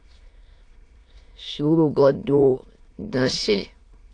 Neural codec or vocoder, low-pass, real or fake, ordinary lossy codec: autoencoder, 22.05 kHz, a latent of 192 numbers a frame, VITS, trained on many speakers; 9.9 kHz; fake; AAC, 48 kbps